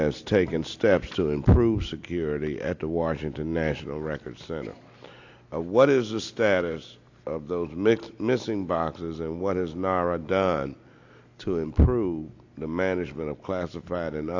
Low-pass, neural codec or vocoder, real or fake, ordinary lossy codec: 7.2 kHz; none; real; AAC, 48 kbps